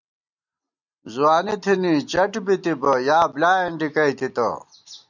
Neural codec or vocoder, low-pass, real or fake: none; 7.2 kHz; real